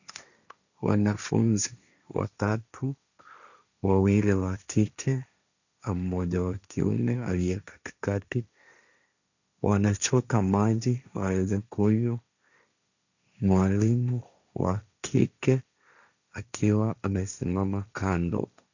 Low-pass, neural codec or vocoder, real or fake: 7.2 kHz; codec, 16 kHz, 1.1 kbps, Voila-Tokenizer; fake